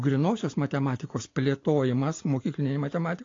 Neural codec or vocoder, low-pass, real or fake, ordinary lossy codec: none; 7.2 kHz; real; AAC, 32 kbps